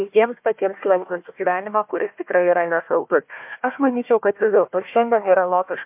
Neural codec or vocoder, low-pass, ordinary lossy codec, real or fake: codec, 16 kHz, 1 kbps, FunCodec, trained on Chinese and English, 50 frames a second; 3.6 kHz; MP3, 32 kbps; fake